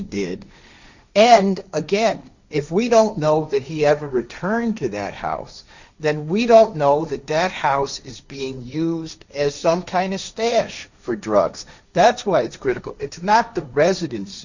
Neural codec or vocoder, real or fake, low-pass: codec, 16 kHz, 1.1 kbps, Voila-Tokenizer; fake; 7.2 kHz